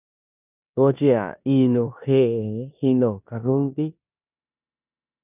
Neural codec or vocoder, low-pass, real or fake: codec, 16 kHz in and 24 kHz out, 0.9 kbps, LongCat-Audio-Codec, four codebook decoder; 3.6 kHz; fake